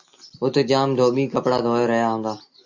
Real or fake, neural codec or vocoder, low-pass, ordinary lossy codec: real; none; 7.2 kHz; AAC, 48 kbps